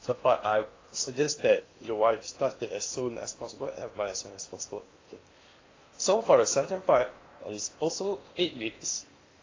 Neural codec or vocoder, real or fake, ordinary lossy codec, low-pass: codec, 16 kHz in and 24 kHz out, 0.8 kbps, FocalCodec, streaming, 65536 codes; fake; AAC, 32 kbps; 7.2 kHz